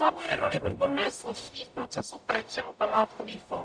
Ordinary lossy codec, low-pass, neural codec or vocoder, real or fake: AAC, 64 kbps; 9.9 kHz; codec, 44.1 kHz, 0.9 kbps, DAC; fake